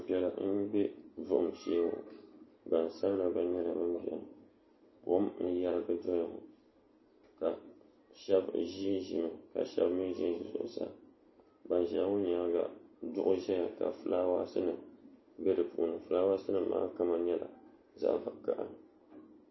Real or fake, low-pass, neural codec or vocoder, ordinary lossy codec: real; 7.2 kHz; none; MP3, 24 kbps